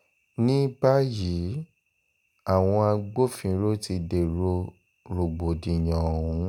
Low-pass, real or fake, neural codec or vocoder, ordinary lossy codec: 19.8 kHz; real; none; none